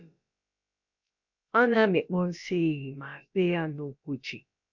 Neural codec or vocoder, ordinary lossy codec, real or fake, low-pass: codec, 16 kHz, about 1 kbps, DyCAST, with the encoder's durations; none; fake; 7.2 kHz